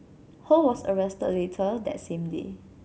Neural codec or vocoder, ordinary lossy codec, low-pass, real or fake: none; none; none; real